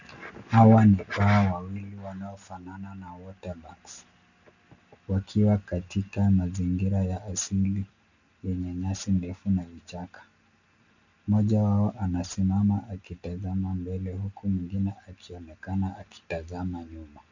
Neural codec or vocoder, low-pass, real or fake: none; 7.2 kHz; real